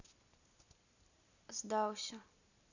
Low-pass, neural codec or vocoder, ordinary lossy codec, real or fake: 7.2 kHz; none; none; real